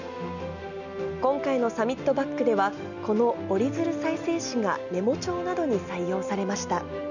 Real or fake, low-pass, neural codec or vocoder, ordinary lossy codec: real; 7.2 kHz; none; none